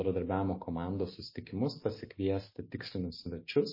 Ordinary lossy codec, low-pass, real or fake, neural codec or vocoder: MP3, 24 kbps; 5.4 kHz; real; none